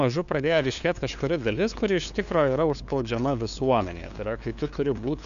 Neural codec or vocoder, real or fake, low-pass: codec, 16 kHz, 2 kbps, FunCodec, trained on LibriTTS, 25 frames a second; fake; 7.2 kHz